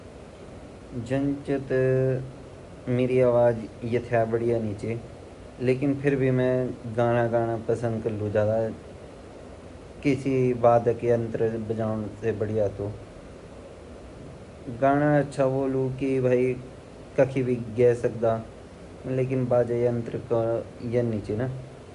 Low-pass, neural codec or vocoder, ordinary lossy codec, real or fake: 10.8 kHz; none; Opus, 64 kbps; real